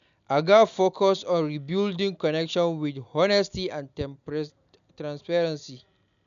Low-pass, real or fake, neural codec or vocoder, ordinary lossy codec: 7.2 kHz; real; none; none